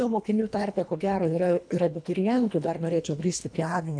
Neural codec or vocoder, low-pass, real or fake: codec, 24 kHz, 1.5 kbps, HILCodec; 9.9 kHz; fake